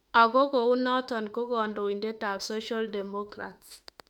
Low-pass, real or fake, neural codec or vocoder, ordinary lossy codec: 19.8 kHz; fake; autoencoder, 48 kHz, 32 numbers a frame, DAC-VAE, trained on Japanese speech; none